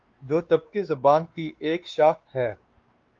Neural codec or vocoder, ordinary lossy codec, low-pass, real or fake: codec, 16 kHz, 2 kbps, X-Codec, WavLM features, trained on Multilingual LibriSpeech; Opus, 32 kbps; 7.2 kHz; fake